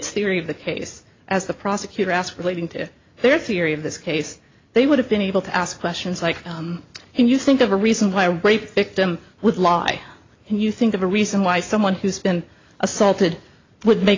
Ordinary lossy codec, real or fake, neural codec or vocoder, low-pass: AAC, 32 kbps; real; none; 7.2 kHz